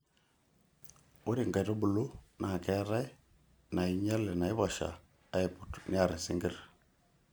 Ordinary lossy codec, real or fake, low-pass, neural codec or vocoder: none; real; none; none